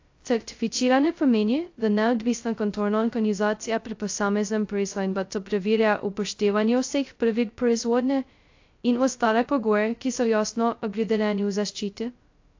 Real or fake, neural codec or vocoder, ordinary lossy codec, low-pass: fake; codec, 16 kHz, 0.2 kbps, FocalCodec; AAC, 48 kbps; 7.2 kHz